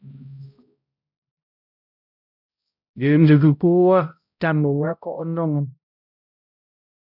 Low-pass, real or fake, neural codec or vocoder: 5.4 kHz; fake; codec, 16 kHz, 0.5 kbps, X-Codec, HuBERT features, trained on balanced general audio